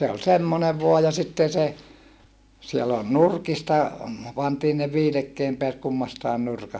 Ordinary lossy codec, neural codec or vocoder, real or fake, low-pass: none; none; real; none